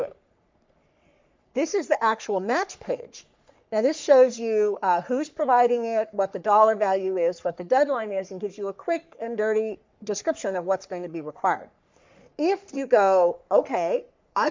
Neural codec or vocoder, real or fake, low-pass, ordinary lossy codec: codec, 44.1 kHz, 3.4 kbps, Pupu-Codec; fake; 7.2 kHz; MP3, 64 kbps